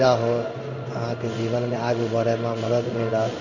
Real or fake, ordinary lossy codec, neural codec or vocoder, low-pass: fake; none; codec, 16 kHz in and 24 kHz out, 1 kbps, XY-Tokenizer; 7.2 kHz